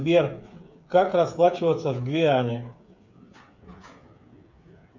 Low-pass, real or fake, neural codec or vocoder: 7.2 kHz; fake; codec, 16 kHz, 8 kbps, FreqCodec, smaller model